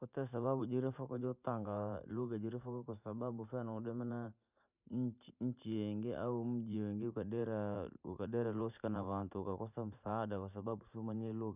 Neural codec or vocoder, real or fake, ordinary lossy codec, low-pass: vocoder, 44.1 kHz, 128 mel bands every 512 samples, BigVGAN v2; fake; none; 3.6 kHz